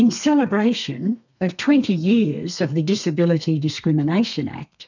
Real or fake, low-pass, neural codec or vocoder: fake; 7.2 kHz; codec, 32 kHz, 1.9 kbps, SNAC